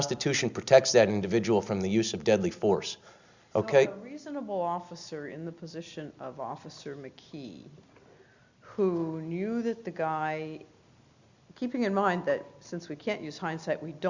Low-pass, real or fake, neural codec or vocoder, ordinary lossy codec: 7.2 kHz; real; none; Opus, 64 kbps